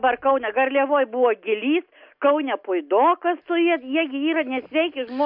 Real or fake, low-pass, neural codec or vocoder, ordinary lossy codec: real; 5.4 kHz; none; MP3, 48 kbps